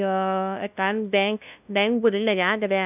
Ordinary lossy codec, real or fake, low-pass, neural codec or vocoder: none; fake; 3.6 kHz; codec, 16 kHz, 0.5 kbps, FunCodec, trained on LibriTTS, 25 frames a second